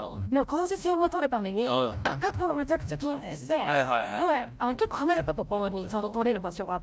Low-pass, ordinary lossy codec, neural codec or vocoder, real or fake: none; none; codec, 16 kHz, 0.5 kbps, FreqCodec, larger model; fake